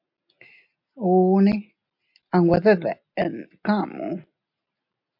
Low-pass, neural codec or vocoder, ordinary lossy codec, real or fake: 5.4 kHz; none; MP3, 48 kbps; real